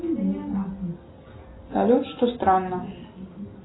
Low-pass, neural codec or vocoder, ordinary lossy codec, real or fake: 7.2 kHz; none; AAC, 16 kbps; real